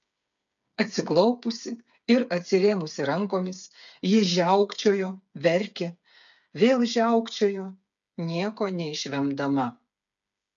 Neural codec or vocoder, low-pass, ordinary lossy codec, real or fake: codec, 16 kHz, 8 kbps, FreqCodec, smaller model; 7.2 kHz; MP3, 64 kbps; fake